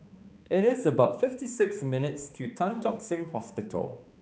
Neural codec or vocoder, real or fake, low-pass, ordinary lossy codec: codec, 16 kHz, 2 kbps, X-Codec, HuBERT features, trained on balanced general audio; fake; none; none